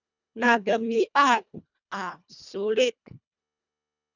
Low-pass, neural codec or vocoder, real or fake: 7.2 kHz; codec, 24 kHz, 1.5 kbps, HILCodec; fake